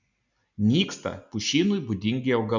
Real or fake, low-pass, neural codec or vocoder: real; 7.2 kHz; none